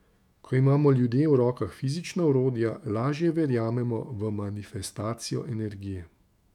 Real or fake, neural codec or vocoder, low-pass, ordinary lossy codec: fake; autoencoder, 48 kHz, 128 numbers a frame, DAC-VAE, trained on Japanese speech; 19.8 kHz; none